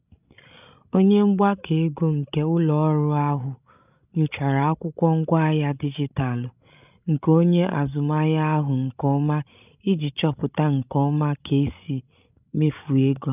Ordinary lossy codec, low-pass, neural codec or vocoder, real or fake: none; 3.6 kHz; codec, 16 kHz, 16 kbps, FreqCodec, larger model; fake